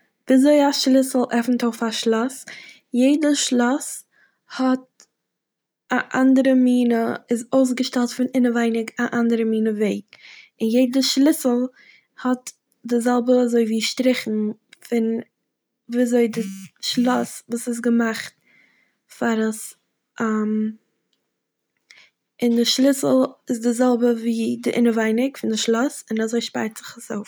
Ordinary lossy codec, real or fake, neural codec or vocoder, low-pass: none; real; none; none